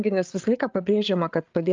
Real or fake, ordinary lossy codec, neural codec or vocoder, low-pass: fake; Opus, 24 kbps; codec, 16 kHz, 8 kbps, FreqCodec, larger model; 7.2 kHz